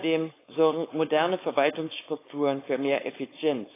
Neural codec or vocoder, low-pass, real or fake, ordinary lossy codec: codec, 16 kHz, 4.8 kbps, FACodec; 3.6 kHz; fake; AAC, 24 kbps